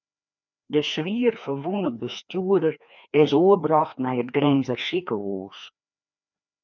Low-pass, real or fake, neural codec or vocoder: 7.2 kHz; fake; codec, 16 kHz, 2 kbps, FreqCodec, larger model